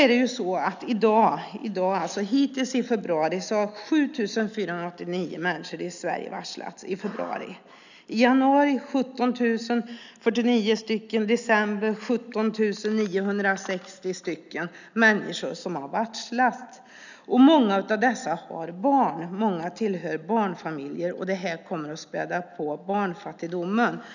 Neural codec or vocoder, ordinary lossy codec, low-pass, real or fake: none; none; 7.2 kHz; real